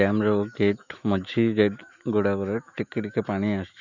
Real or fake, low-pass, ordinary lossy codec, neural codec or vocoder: fake; 7.2 kHz; none; autoencoder, 48 kHz, 128 numbers a frame, DAC-VAE, trained on Japanese speech